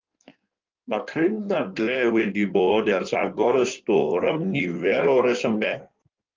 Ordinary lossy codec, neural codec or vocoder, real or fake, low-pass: Opus, 24 kbps; codec, 16 kHz in and 24 kHz out, 1.1 kbps, FireRedTTS-2 codec; fake; 7.2 kHz